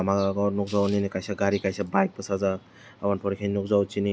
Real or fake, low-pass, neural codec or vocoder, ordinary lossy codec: real; none; none; none